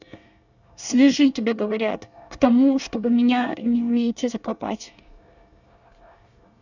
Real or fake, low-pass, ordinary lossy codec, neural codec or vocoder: fake; 7.2 kHz; none; codec, 24 kHz, 1 kbps, SNAC